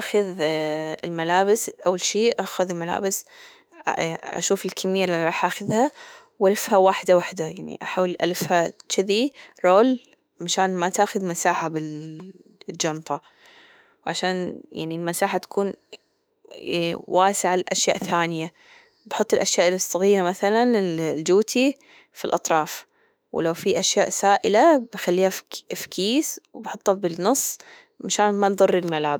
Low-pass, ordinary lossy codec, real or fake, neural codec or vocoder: none; none; fake; autoencoder, 48 kHz, 32 numbers a frame, DAC-VAE, trained on Japanese speech